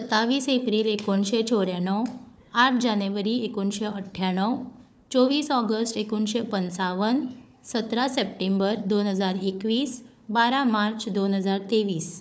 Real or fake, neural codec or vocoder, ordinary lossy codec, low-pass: fake; codec, 16 kHz, 4 kbps, FunCodec, trained on Chinese and English, 50 frames a second; none; none